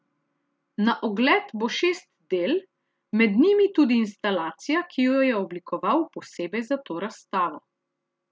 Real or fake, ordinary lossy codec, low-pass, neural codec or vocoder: real; none; none; none